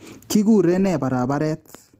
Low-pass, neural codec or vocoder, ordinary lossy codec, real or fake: 14.4 kHz; none; Opus, 16 kbps; real